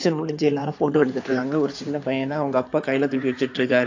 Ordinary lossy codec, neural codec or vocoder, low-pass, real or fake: AAC, 48 kbps; codec, 16 kHz in and 24 kHz out, 2.2 kbps, FireRedTTS-2 codec; 7.2 kHz; fake